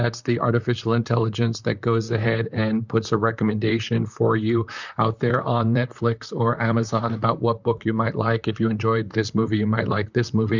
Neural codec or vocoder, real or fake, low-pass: vocoder, 44.1 kHz, 128 mel bands, Pupu-Vocoder; fake; 7.2 kHz